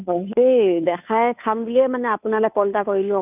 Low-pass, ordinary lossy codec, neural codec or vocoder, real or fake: 3.6 kHz; none; codec, 16 kHz, 2 kbps, FunCodec, trained on Chinese and English, 25 frames a second; fake